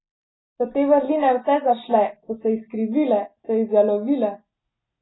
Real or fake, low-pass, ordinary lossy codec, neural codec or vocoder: real; 7.2 kHz; AAC, 16 kbps; none